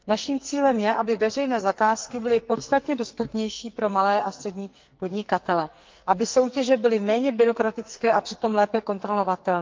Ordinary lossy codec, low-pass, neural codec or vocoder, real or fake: Opus, 24 kbps; 7.2 kHz; codec, 44.1 kHz, 2.6 kbps, SNAC; fake